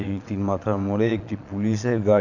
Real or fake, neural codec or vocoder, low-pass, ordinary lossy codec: fake; vocoder, 22.05 kHz, 80 mel bands, Vocos; 7.2 kHz; none